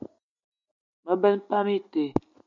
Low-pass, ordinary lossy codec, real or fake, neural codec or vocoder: 7.2 kHz; MP3, 96 kbps; real; none